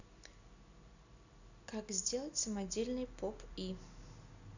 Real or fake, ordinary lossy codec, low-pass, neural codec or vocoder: real; none; 7.2 kHz; none